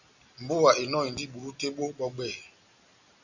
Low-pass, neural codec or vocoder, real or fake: 7.2 kHz; none; real